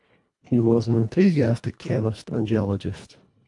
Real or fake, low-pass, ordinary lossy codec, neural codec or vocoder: fake; 10.8 kHz; AAC, 64 kbps; codec, 24 kHz, 1.5 kbps, HILCodec